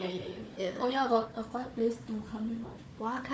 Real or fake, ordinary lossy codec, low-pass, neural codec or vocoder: fake; none; none; codec, 16 kHz, 4 kbps, FunCodec, trained on Chinese and English, 50 frames a second